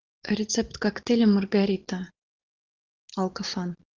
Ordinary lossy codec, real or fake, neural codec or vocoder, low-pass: Opus, 16 kbps; real; none; 7.2 kHz